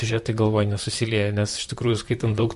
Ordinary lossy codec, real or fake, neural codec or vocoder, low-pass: MP3, 48 kbps; fake; vocoder, 44.1 kHz, 128 mel bands, Pupu-Vocoder; 14.4 kHz